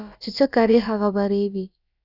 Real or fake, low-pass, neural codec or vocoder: fake; 5.4 kHz; codec, 16 kHz, about 1 kbps, DyCAST, with the encoder's durations